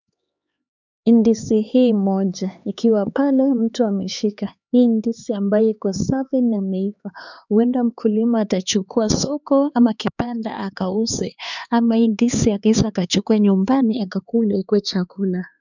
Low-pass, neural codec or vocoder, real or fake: 7.2 kHz; codec, 16 kHz, 4 kbps, X-Codec, HuBERT features, trained on LibriSpeech; fake